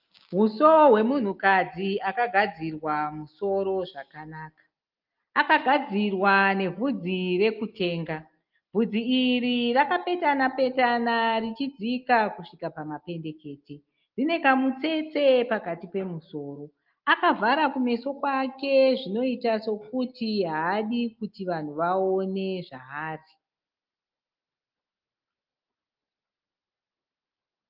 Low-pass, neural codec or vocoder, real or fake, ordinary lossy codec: 5.4 kHz; none; real; Opus, 32 kbps